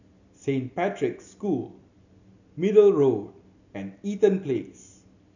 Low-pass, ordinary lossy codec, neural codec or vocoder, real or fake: 7.2 kHz; none; none; real